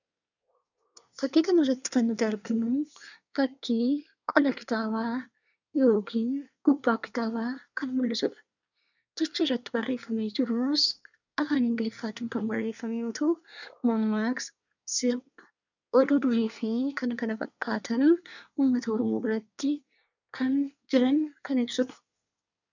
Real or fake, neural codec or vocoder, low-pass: fake; codec, 24 kHz, 1 kbps, SNAC; 7.2 kHz